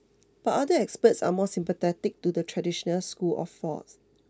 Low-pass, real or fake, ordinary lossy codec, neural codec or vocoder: none; real; none; none